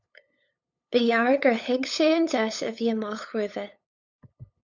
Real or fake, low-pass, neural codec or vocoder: fake; 7.2 kHz; codec, 16 kHz, 8 kbps, FunCodec, trained on LibriTTS, 25 frames a second